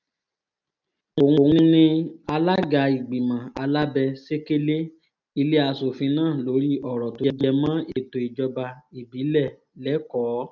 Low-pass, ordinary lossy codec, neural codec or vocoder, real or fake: 7.2 kHz; none; none; real